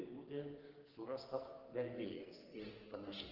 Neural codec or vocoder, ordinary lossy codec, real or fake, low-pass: codec, 44.1 kHz, 2.6 kbps, SNAC; Opus, 16 kbps; fake; 5.4 kHz